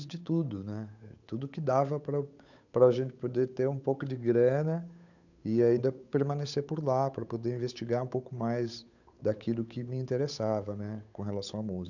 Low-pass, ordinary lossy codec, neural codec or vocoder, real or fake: 7.2 kHz; none; codec, 16 kHz, 8 kbps, FunCodec, trained on Chinese and English, 25 frames a second; fake